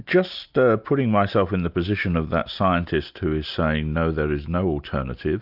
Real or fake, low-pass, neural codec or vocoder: real; 5.4 kHz; none